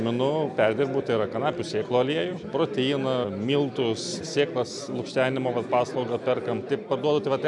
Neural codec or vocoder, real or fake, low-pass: none; real; 10.8 kHz